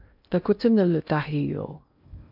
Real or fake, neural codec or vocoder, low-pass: fake; codec, 16 kHz in and 24 kHz out, 0.6 kbps, FocalCodec, streaming, 2048 codes; 5.4 kHz